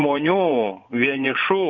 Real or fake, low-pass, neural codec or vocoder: fake; 7.2 kHz; vocoder, 24 kHz, 100 mel bands, Vocos